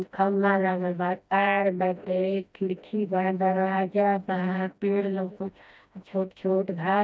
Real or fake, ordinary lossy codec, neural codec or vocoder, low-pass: fake; none; codec, 16 kHz, 1 kbps, FreqCodec, smaller model; none